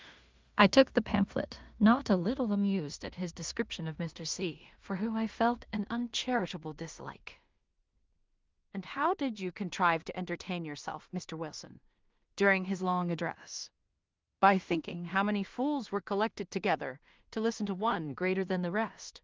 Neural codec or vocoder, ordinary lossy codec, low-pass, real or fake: codec, 16 kHz in and 24 kHz out, 0.4 kbps, LongCat-Audio-Codec, two codebook decoder; Opus, 32 kbps; 7.2 kHz; fake